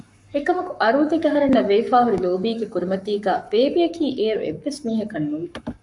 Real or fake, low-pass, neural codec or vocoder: fake; 10.8 kHz; codec, 44.1 kHz, 7.8 kbps, Pupu-Codec